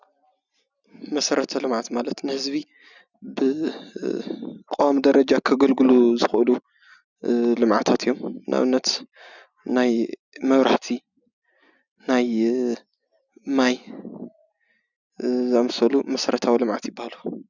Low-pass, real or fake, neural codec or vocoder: 7.2 kHz; real; none